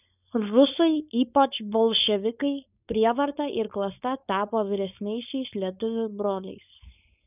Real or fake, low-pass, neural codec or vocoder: fake; 3.6 kHz; codec, 16 kHz, 4.8 kbps, FACodec